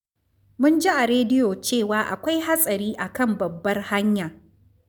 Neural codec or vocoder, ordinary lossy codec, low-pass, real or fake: none; none; none; real